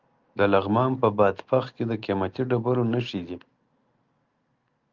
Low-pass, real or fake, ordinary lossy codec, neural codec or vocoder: 7.2 kHz; real; Opus, 24 kbps; none